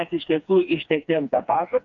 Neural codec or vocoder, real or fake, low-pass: codec, 16 kHz, 2 kbps, FreqCodec, smaller model; fake; 7.2 kHz